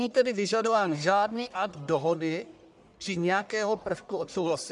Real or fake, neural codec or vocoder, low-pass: fake; codec, 44.1 kHz, 1.7 kbps, Pupu-Codec; 10.8 kHz